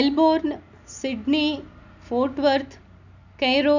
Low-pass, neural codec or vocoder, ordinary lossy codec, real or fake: 7.2 kHz; none; none; real